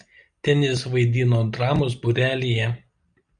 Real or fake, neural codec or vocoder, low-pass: real; none; 9.9 kHz